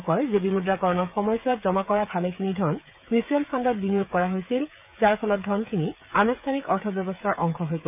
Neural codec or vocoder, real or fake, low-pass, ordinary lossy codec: codec, 16 kHz, 16 kbps, FreqCodec, smaller model; fake; 3.6 kHz; none